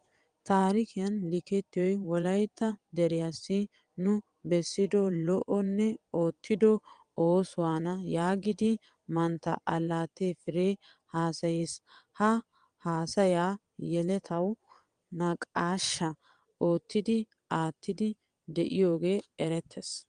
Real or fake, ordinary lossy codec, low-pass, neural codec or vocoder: fake; Opus, 32 kbps; 9.9 kHz; vocoder, 22.05 kHz, 80 mel bands, WaveNeXt